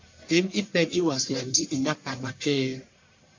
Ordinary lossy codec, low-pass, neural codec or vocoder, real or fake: MP3, 48 kbps; 7.2 kHz; codec, 44.1 kHz, 1.7 kbps, Pupu-Codec; fake